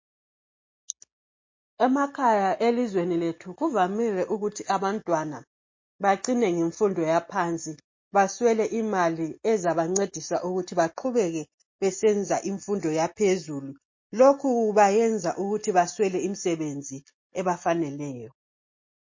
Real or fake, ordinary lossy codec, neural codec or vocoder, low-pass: real; MP3, 32 kbps; none; 7.2 kHz